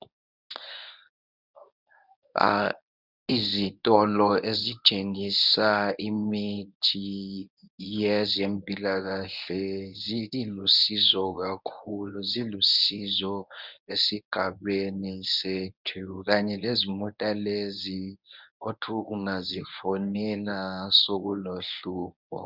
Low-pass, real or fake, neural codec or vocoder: 5.4 kHz; fake; codec, 24 kHz, 0.9 kbps, WavTokenizer, medium speech release version 1